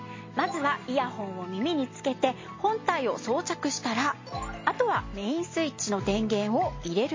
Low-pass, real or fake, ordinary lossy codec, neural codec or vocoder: 7.2 kHz; real; MP3, 32 kbps; none